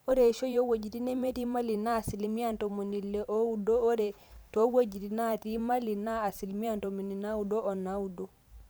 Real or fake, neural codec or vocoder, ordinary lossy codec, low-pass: fake; vocoder, 44.1 kHz, 128 mel bands every 512 samples, BigVGAN v2; none; none